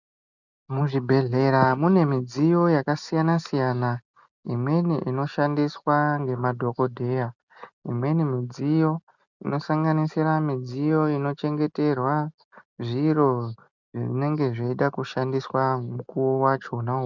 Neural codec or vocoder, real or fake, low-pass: none; real; 7.2 kHz